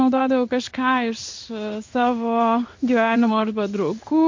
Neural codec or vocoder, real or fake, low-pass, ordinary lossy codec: codec, 16 kHz in and 24 kHz out, 1 kbps, XY-Tokenizer; fake; 7.2 kHz; MP3, 48 kbps